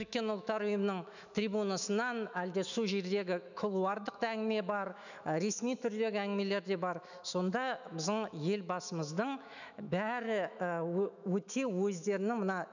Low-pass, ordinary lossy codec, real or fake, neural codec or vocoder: 7.2 kHz; none; fake; autoencoder, 48 kHz, 128 numbers a frame, DAC-VAE, trained on Japanese speech